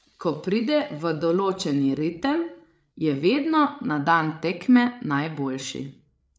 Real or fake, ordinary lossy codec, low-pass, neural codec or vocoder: fake; none; none; codec, 16 kHz, 8 kbps, FreqCodec, larger model